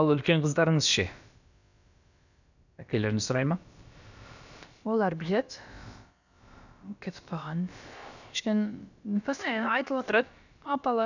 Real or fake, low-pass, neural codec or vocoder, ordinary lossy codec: fake; 7.2 kHz; codec, 16 kHz, about 1 kbps, DyCAST, with the encoder's durations; none